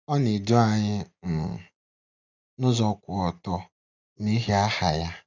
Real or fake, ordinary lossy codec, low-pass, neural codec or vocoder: real; none; 7.2 kHz; none